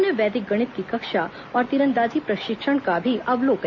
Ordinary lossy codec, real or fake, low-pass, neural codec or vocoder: MP3, 64 kbps; real; 7.2 kHz; none